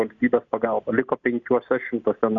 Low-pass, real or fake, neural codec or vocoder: 7.2 kHz; real; none